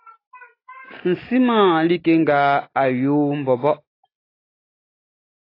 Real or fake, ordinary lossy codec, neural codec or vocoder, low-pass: real; AAC, 24 kbps; none; 5.4 kHz